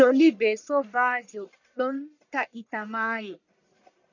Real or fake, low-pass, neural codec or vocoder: fake; 7.2 kHz; codec, 44.1 kHz, 1.7 kbps, Pupu-Codec